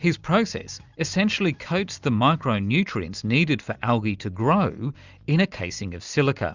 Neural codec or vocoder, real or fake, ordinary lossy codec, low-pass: none; real; Opus, 64 kbps; 7.2 kHz